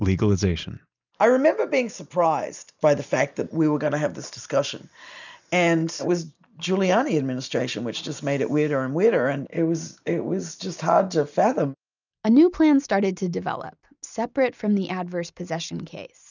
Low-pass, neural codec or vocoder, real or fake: 7.2 kHz; none; real